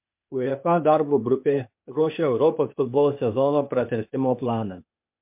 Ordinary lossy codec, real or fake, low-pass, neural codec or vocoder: MP3, 32 kbps; fake; 3.6 kHz; codec, 16 kHz, 0.8 kbps, ZipCodec